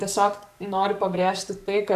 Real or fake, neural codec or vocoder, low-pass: fake; codec, 44.1 kHz, 7.8 kbps, DAC; 14.4 kHz